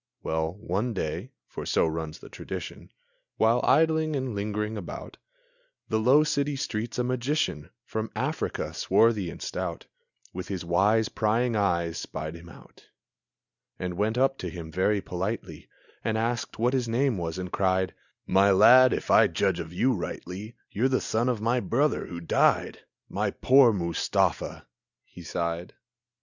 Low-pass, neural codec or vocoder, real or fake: 7.2 kHz; none; real